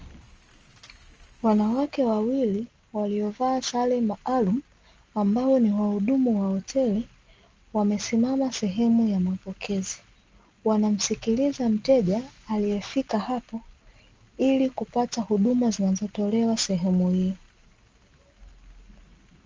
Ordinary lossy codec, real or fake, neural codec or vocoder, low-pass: Opus, 24 kbps; real; none; 7.2 kHz